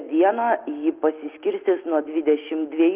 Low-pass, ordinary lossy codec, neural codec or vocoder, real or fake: 3.6 kHz; Opus, 32 kbps; none; real